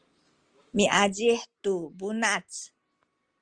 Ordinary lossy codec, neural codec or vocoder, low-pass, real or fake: Opus, 24 kbps; none; 9.9 kHz; real